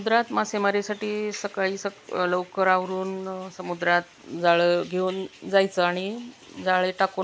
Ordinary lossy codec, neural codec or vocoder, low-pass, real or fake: none; none; none; real